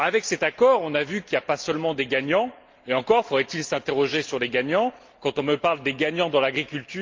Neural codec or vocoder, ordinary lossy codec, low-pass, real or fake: none; Opus, 32 kbps; 7.2 kHz; real